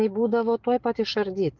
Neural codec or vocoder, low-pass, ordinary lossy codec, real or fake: none; 7.2 kHz; Opus, 24 kbps; real